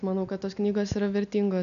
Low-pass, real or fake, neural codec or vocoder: 7.2 kHz; real; none